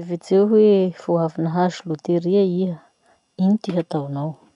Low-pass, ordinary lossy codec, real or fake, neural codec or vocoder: 10.8 kHz; none; real; none